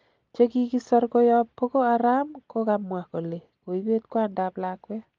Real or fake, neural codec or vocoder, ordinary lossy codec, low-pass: real; none; Opus, 32 kbps; 7.2 kHz